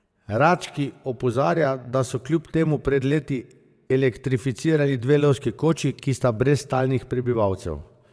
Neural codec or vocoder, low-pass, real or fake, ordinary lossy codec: vocoder, 22.05 kHz, 80 mel bands, WaveNeXt; none; fake; none